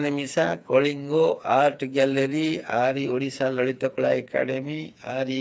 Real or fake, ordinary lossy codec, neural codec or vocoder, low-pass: fake; none; codec, 16 kHz, 4 kbps, FreqCodec, smaller model; none